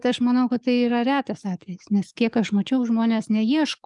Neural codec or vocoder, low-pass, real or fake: codec, 44.1 kHz, 7.8 kbps, DAC; 10.8 kHz; fake